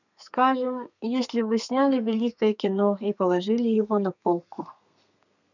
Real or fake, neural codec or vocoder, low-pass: fake; codec, 32 kHz, 1.9 kbps, SNAC; 7.2 kHz